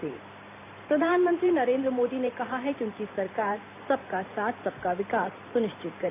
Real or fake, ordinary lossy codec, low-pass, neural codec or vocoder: fake; AAC, 24 kbps; 3.6 kHz; vocoder, 44.1 kHz, 128 mel bands every 512 samples, BigVGAN v2